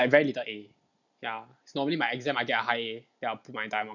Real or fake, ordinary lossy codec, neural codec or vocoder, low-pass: real; none; none; 7.2 kHz